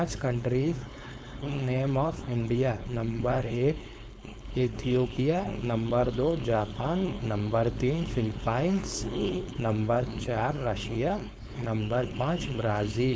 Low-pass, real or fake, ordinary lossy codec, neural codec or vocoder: none; fake; none; codec, 16 kHz, 4.8 kbps, FACodec